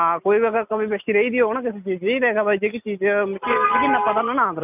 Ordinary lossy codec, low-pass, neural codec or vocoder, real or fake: none; 3.6 kHz; none; real